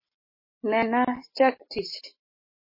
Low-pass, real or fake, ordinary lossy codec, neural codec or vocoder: 5.4 kHz; real; MP3, 24 kbps; none